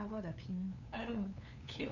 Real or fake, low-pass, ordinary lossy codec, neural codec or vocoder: fake; 7.2 kHz; none; codec, 16 kHz, 2 kbps, FunCodec, trained on LibriTTS, 25 frames a second